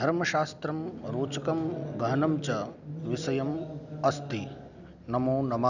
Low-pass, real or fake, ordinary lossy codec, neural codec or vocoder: 7.2 kHz; real; none; none